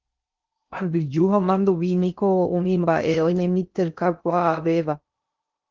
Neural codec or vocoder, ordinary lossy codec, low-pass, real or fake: codec, 16 kHz in and 24 kHz out, 0.6 kbps, FocalCodec, streaming, 4096 codes; Opus, 32 kbps; 7.2 kHz; fake